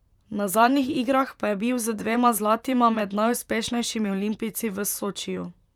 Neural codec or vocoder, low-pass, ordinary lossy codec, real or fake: vocoder, 44.1 kHz, 128 mel bands, Pupu-Vocoder; 19.8 kHz; none; fake